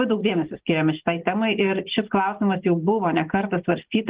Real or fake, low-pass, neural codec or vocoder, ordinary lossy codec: real; 3.6 kHz; none; Opus, 16 kbps